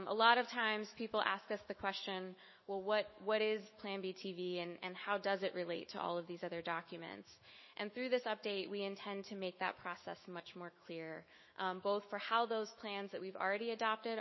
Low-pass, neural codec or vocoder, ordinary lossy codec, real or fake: 7.2 kHz; autoencoder, 48 kHz, 128 numbers a frame, DAC-VAE, trained on Japanese speech; MP3, 24 kbps; fake